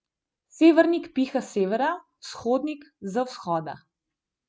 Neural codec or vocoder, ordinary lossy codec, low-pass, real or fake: none; none; none; real